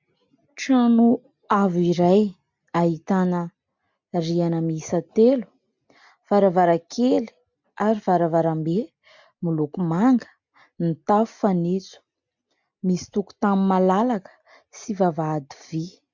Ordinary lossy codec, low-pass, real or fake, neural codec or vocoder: MP3, 64 kbps; 7.2 kHz; real; none